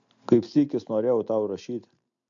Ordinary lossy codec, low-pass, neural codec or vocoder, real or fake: AAC, 64 kbps; 7.2 kHz; none; real